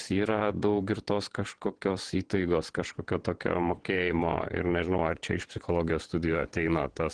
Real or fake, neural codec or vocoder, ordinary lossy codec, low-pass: fake; vocoder, 22.05 kHz, 80 mel bands, WaveNeXt; Opus, 16 kbps; 9.9 kHz